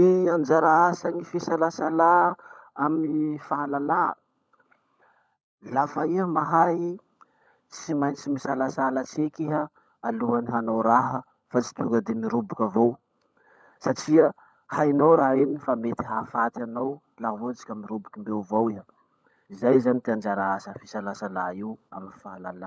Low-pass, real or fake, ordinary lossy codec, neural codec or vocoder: none; fake; none; codec, 16 kHz, 16 kbps, FunCodec, trained on LibriTTS, 50 frames a second